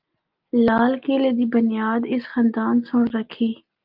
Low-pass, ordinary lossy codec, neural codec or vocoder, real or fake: 5.4 kHz; Opus, 24 kbps; none; real